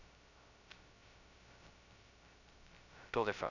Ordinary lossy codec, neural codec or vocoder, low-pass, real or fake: none; codec, 16 kHz, 0.2 kbps, FocalCodec; 7.2 kHz; fake